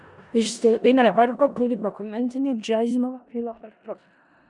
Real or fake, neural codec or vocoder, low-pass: fake; codec, 16 kHz in and 24 kHz out, 0.4 kbps, LongCat-Audio-Codec, four codebook decoder; 10.8 kHz